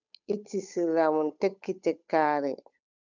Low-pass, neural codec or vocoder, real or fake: 7.2 kHz; codec, 16 kHz, 8 kbps, FunCodec, trained on Chinese and English, 25 frames a second; fake